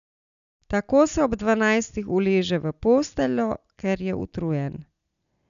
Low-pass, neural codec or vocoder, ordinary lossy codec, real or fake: 7.2 kHz; none; none; real